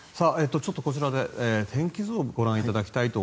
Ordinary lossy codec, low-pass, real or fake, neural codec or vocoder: none; none; real; none